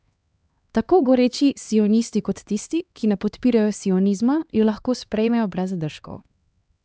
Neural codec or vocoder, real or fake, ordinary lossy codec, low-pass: codec, 16 kHz, 2 kbps, X-Codec, HuBERT features, trained on LibriSpeech; fake; none; none